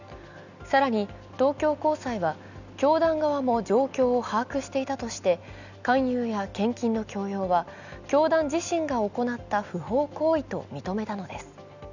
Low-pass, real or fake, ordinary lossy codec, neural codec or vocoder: 7.2 kHz; real; none; none